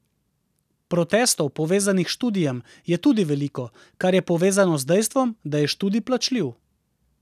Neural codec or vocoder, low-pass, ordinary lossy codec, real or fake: none; 14.4 kHz; none; real